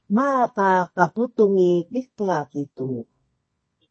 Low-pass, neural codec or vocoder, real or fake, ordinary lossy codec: 9.9 kHz; codec, 24 kHz, 0.9 kbps, WavTokenizer, medium music audio release; fake; MP3, 32 kbps